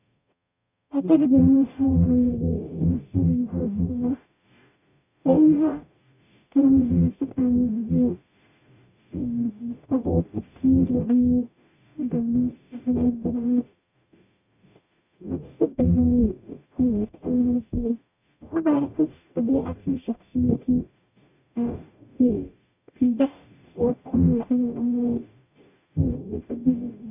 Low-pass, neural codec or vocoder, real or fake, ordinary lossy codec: 3.6 kHz; codec, 44.1 kHz, 0.9 kbps, DAC; fake; none